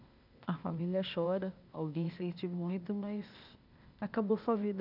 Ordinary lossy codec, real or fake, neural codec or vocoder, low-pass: none; fake; codec, 16 kHz, 0.8 kbps, ZipCodec; 5.4 kHz